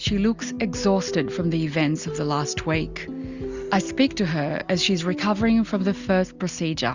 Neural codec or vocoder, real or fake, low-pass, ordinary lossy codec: none; real; 7.2 kHz; Opus, 64 kbps